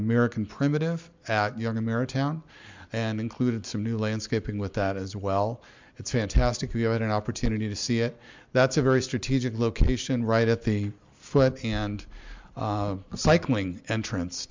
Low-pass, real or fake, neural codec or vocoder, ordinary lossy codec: 7.2 kHz; real; none; MP3, 64 kbps